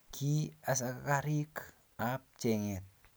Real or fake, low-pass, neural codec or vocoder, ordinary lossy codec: real; none; none; none